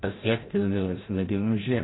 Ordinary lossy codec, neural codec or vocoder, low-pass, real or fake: AAC, 16 kbps; codec, 16 kHz, 0.5 kbps, FreqCodec, larger model; 7.2 kHz; fake